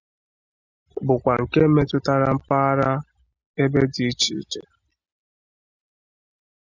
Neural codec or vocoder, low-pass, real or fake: none; 7.2 kHz; real